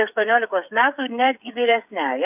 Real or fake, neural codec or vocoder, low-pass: fake; codec, 16 kHz, 8 kbps, FreqCodec, smaller model; 3.6 kHz